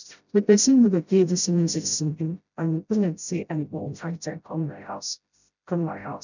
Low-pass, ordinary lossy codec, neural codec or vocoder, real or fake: 7.2 kHz; none; codec, 16 kHz, 0.5 kbps, FreqCodec, smaller model; fake